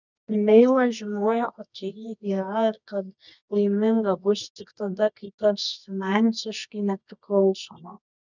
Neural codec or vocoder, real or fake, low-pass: codec, 24 kHz, 0.9 kbps, WavTokenizer, medium music audio release; fake; 7.2 kHz